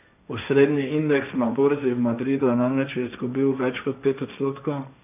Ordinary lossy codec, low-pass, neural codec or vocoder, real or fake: none; 3.6 kHz; codec, 16 kHz, 1.1 kbps, Voila-Tokenizer; fake